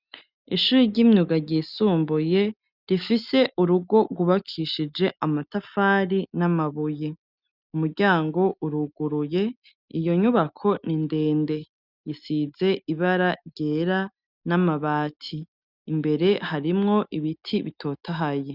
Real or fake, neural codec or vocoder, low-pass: real; none; 5.4 kHz